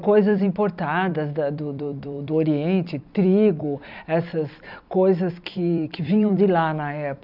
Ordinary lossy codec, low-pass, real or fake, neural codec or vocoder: none; 5.4 kHz; fake; vocoder, 44.1 kHz, 128 mel bands every 256 samples, BigVGAN v2